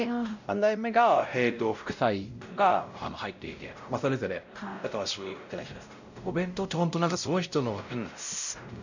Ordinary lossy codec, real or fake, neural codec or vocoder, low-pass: none; fake; codec, 16 kHz, 0.5 kbps, X-Codec, WavLM features, trained on Multilingual LibriSpeech; 7.2 kHz